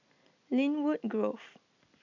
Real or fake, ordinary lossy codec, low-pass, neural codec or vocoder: real; none; 7.2 kHz; none